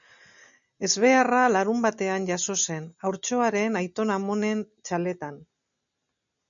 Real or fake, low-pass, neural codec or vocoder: real; 7.2 kHz; none